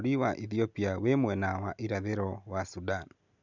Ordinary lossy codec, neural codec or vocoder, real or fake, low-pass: none; none; real; 7.2 kHz